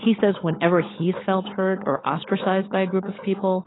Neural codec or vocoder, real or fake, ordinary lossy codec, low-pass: codec, 16 kHz, 16 kbps, FunCodec, trained on LibriTTS, 50 frames a second; fake; AAC, 16 kbps; 7.2 kHz